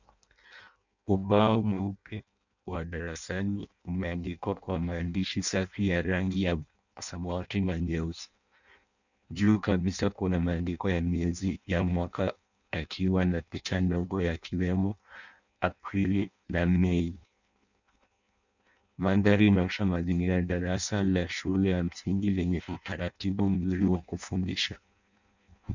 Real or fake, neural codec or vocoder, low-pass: fake; codec, 16 kHz in and 24 kHz out, 0.6 kbps, FireRedTTS-2 codec; 7.2 kHz